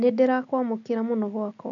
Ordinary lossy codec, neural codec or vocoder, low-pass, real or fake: none; none; 7.2 kHz; real